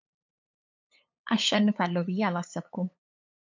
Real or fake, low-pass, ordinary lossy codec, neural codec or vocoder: fake; 7.2 kHz; MP3, 64 kbps; codec, 16 kHz, 8 kbps, FunCodec, trained on LibriTTS, 25 frames a second